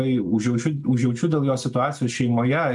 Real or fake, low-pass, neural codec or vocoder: real; 10.8 kHz; none